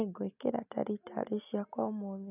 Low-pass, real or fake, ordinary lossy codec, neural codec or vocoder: 3.6 kHz; real; none; none